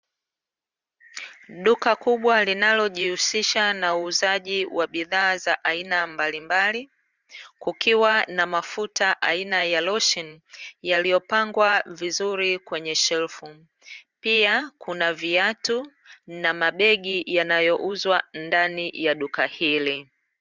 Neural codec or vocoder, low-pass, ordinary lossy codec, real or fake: vocoder, 44.1 kHz, 128 mel bands every 512 samples, BigVGAN v2; 7.2 kHz; Opus, 64 kbps; fake